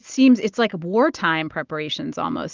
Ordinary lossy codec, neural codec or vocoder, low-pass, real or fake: Opus, 32 kbps; none; 7.2 kHz; real